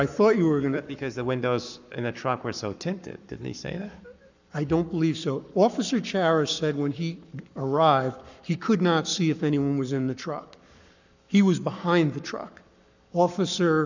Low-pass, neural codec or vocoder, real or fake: 7.2 kHz; codec, 44.1 kHz, 7.8 kbps, Pupu-Codec; fake